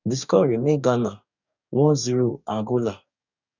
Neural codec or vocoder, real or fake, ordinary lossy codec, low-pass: codec, 44.1 kHz, 2.6 kbps, DAC; fake; none; 7.2 kHz